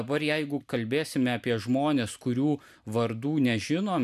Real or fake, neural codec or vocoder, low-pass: real; none; 14.4 kHz